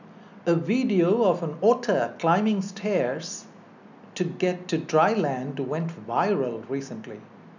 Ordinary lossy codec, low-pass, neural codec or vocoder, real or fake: none; 7.2 kHz; none; real